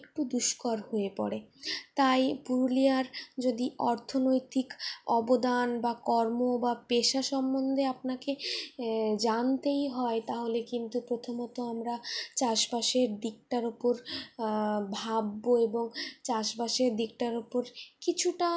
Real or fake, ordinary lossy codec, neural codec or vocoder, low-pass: real; none; none; none